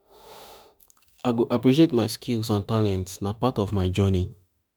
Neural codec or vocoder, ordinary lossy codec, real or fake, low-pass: autoencoder, 48 kHz, 32 numbers a frame, DAC-VAE, trained on Japanese speech; none; fake; none